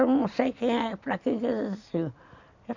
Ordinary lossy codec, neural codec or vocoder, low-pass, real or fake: none; vocoder, 44.1 kHz, 128 mel bands every 256 samples, BigVGAN v2; 7.2 kHz; fake